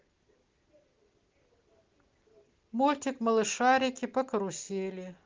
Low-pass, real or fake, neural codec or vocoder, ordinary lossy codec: 7.2 kHz; real; none; Opus, 16 kbps